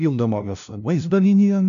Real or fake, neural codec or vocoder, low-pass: fake; codec, 16 kHz, 0.5 kbps, FunCodec, trained on LibriTTS, 25 frames a second; 7.2 kHz